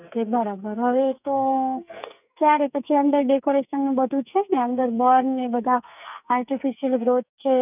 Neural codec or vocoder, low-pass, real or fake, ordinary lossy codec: codec, 44.1 kHz, 2.6 kbps, SNAC; 3.6 kHz; fake; none